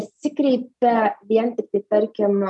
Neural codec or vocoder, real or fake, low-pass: none; real; 10.8 kHz